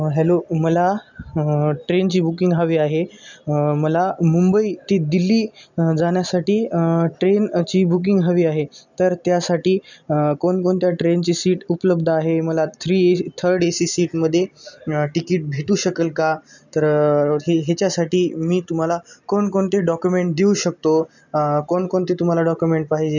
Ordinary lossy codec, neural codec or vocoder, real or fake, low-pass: none; none; real; 7.2 kHz